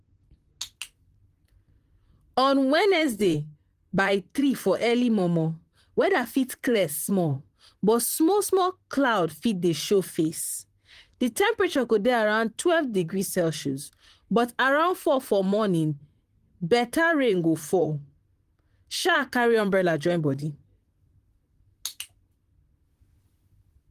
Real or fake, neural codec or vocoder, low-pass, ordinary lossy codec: fake; vocoder, 44.1 kHz, 128 mel bands, Pupu-Vocoder; 14.4 kHz; Opus, 24 kbps